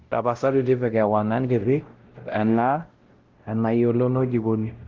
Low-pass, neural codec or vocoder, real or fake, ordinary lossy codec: 7.2 kHz; codec, 16 kHz, 0.5 kbps, X-Codec, WavLM features, trained on Multilingual LibriSpeech; fake; Opus, 16 kbps